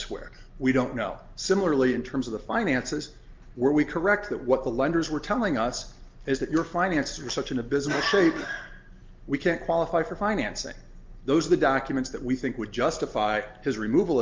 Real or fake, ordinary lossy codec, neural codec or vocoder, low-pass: real; Opus, 32 kbps; none; 7.2 kHz